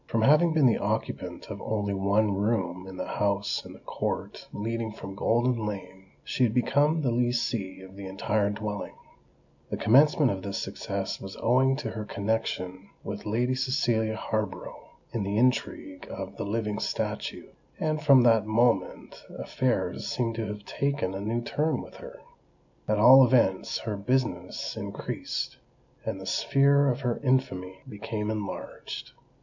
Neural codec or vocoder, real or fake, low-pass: none; real; 7.2 kHz